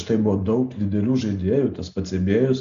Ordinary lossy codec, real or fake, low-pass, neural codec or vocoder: MP3, 64 kbps; real; 7.2 kHz; none